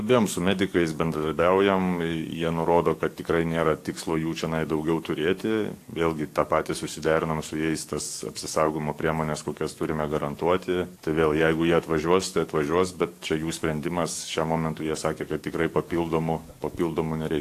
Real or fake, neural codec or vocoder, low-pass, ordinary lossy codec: fake; codec, 44.1 kHz, 7.8 kbps, DAC; 14.4 kHz; AAC, 64 kbps